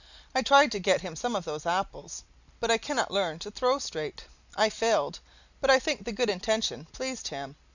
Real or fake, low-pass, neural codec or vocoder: real; 7.2 kHz; none